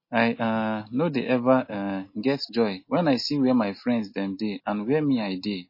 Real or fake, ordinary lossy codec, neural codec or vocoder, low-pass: real; MP3, 24 kbps; none; 5.4 kHz